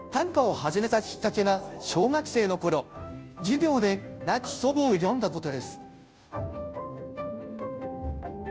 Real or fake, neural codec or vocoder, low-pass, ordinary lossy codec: fake; codec, 16 kHz, 0.5 kbps, FunCodec, trained on Chinese and English, 25 frames a second; none; none